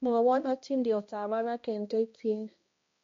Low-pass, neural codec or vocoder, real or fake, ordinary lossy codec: 7.2 kHz; codec, 16 kHz, 1 kbps, X-Codec, HuBERT features, trained on balanced general audio; fake; MP3, 48 kbps